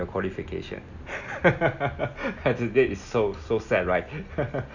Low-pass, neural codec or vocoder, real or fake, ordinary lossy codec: 7.2 kHz; none; real; none